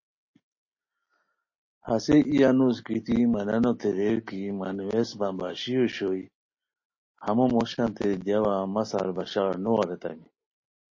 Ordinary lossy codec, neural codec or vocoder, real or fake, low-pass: MP3, 32 kbps; none; real; 7.2 kHz